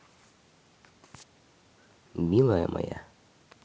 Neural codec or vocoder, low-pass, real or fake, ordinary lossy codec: none; none; real; none